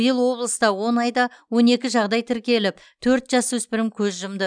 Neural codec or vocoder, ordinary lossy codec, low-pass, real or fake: none; none; 9.9 kHz; real